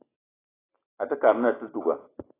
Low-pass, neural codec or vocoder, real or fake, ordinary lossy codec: 3.6 kHz; none; real; AAC, 16 kbps